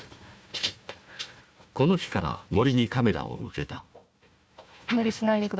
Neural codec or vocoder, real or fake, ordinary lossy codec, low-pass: codec, 16 kHz, 1 kbps, FunCodec, trained on Chinese and English, 50 frames a second; fake; none; none